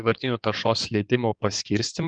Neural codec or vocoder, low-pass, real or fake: codec, 16 kHz in and 24 kHz out, 2.2 kbps, FireRedTTS-2 codec; 9.9 kHz; fake